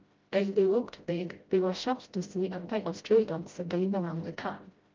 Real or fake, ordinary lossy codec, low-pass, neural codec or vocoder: fake; Opus, 24 kbps; 7.2 kHz; codec, 16 kHz, 0.5 kbps, FreqCodec, smaller model